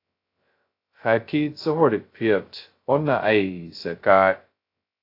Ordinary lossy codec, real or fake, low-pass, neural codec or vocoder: AAC, 32 kbps; fake; 5.4 kHz; codec, 16 kHz, 0.2 kbps, FocalCodec